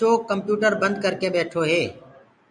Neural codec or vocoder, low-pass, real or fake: none; 9.9 kHz; real